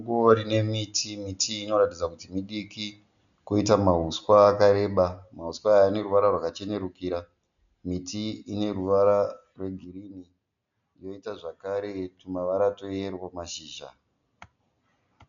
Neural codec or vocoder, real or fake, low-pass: none; real; 7.2 kHz